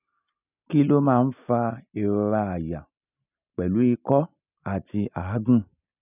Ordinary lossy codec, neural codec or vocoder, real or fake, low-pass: none; none; real; 3.6 kHz